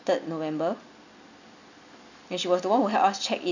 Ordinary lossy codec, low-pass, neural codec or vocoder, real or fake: none; 7.2 kHz; none; real